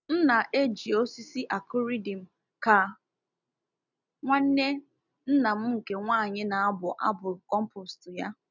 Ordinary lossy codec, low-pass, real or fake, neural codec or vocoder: none; 7.2 kHz; real; none